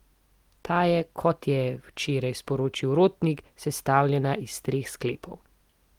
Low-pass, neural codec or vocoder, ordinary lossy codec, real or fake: 19.8 kHz; none; Opus, 24 kbps; real